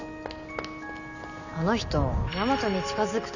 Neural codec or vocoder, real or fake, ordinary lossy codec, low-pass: none; real; none; 7.2 kHz